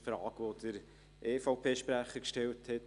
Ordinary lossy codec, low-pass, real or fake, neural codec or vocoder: Opus, 64 kbps; 10.8 kHz; real; none